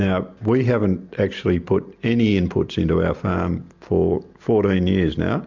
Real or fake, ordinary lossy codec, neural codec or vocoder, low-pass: real; MP3, 64 kbps; none; 7.2 kHz